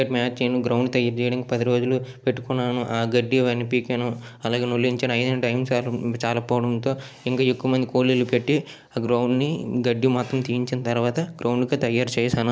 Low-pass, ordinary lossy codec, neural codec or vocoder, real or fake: none; none; none; real